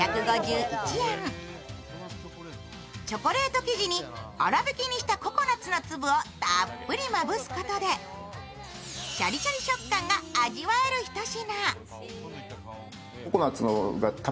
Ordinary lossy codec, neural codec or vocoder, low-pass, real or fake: none; none; none; real